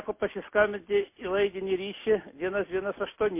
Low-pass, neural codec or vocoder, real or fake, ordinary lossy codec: 3.6 kHz; none; real; MP3, 24 kbps